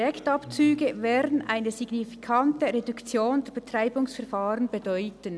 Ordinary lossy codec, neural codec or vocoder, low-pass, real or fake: none; none; none; real